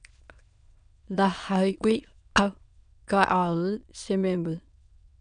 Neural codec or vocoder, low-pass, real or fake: autoencoder, 22.05 kHz, a latent of 192 numbers a frame, VITS, trained on many speakers; 9.9 kHz; fake